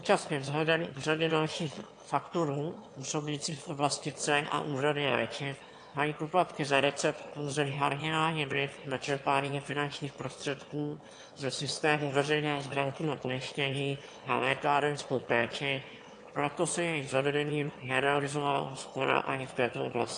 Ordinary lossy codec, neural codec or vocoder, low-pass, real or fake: AAC, 48 kbps; autoencoder, 22.05 kHz, a latent of 192 numbers a frame, VITS, trained on one speaker; 9.9 kHz; fake